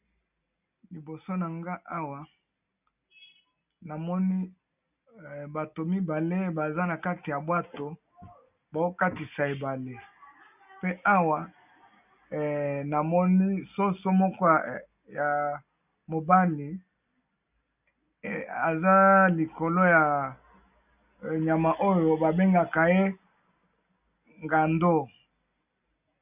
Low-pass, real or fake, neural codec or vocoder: 3.6 kHz; real; none